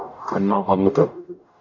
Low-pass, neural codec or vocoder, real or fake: 7.2 kHz; codec, 44.1 kHz, 0.9 kbps, DAC; fake